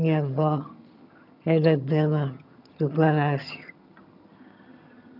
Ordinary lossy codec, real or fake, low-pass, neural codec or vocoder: none; fake; 5.4 kHz; vocoder, 22.05 kHz, 80 mel bands, HiFi-GAN